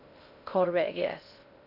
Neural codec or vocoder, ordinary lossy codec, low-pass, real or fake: codec, 16 kHz in and 24 kHz out, 0.6 kbps, FocalCodec, streaming, 2048 codes; none; 5.4 kHz; fake